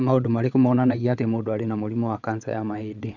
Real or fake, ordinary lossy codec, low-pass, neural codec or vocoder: fake; none; 7.2 kHz; vocoder, 22.05 kHz, 80 mel bands, WaveNeXt